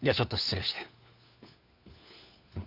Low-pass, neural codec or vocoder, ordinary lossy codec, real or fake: 5.4 kHz; codec, 16 kHz, 4 kbps, FunCodec, trained on LibriTTS, 50 frames a second; none; fake